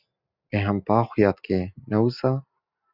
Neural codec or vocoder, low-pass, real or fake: none; 5.4 kHz; real